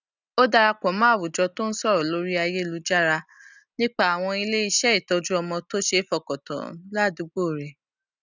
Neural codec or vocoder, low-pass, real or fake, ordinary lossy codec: none; 7.2 kHz; real; none